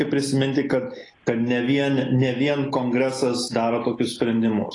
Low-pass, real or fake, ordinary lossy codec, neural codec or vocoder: 10.8 kHz; real; AAC, 32 kbps; none